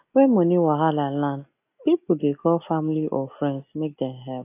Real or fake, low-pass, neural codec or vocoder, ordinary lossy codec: real; 3.6 kHz; none; AAC, 24 kbps